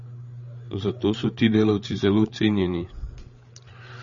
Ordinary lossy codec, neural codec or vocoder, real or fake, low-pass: MP3, 32 kbps; codec, 16 kHz, 8 kbps, FreqCodec, larger model; fake; 7.2 kHz